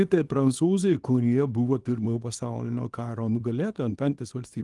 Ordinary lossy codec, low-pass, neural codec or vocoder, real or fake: Opus, 24 kbps; 10.8 kHz; codec, 24 kHz, 0.9 kbps, WavTokenizer, small release; fake